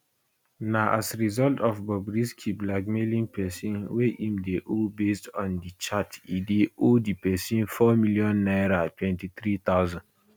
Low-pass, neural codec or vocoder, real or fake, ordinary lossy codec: none; none; real; none